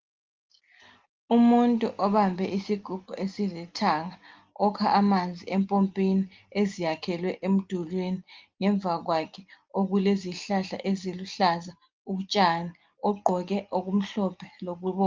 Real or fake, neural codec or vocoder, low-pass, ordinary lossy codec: real; none; 7.2 kHz; Opus, 32 kbps